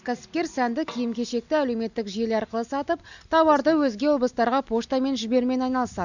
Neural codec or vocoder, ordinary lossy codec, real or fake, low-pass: none; none; real; 7.2 kHz